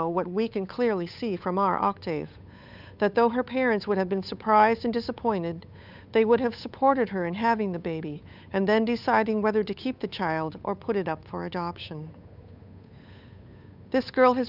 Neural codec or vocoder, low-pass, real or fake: codec, 16 kHz, 8 kbps, FunCodec, trained on Chinese and English, 25 frames a second; 5.4 kHz; fake